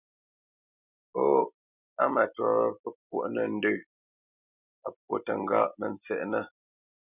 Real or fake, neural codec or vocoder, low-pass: real; none; 3.6 kHz